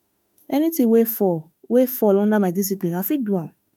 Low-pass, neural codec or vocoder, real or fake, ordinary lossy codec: none; autoencoder, 48 kHz, 32 numbers a frame, DAC-VAE, trained on Japanese speech; fake; none